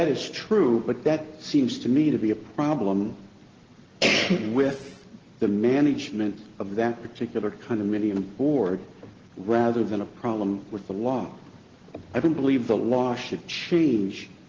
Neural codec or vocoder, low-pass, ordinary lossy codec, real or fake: none; 7.2 kHz; Opus, 16 kbps; real